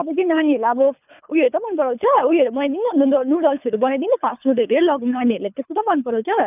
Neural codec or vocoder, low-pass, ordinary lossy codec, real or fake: codec, 24 kHz, 3 kbps, HILCodec; 3.6 kHz; none; fake